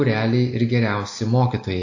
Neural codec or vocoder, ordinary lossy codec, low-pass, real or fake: none; AAC, 48 kbps; 7.2 kHz; real